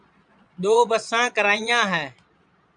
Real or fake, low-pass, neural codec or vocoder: fake; 9.9 kHz; vocoder, 22.05 kHz, 80 mel bands, Vocos